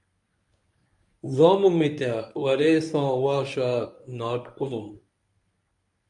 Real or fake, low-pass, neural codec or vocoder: fake; 10.8 kHz; codec, 24 kHz, 0.9 kbps, WavTokenizer, medium speech release version 1